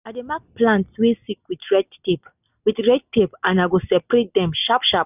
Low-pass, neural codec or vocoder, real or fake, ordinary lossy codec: 3.6 kHz; none; real; none